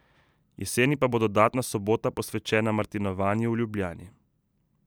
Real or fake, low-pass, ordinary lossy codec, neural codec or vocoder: real; none; none; none